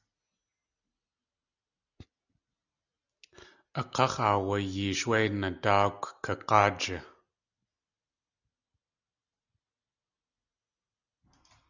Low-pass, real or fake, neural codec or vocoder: 7.2 kHz; real; none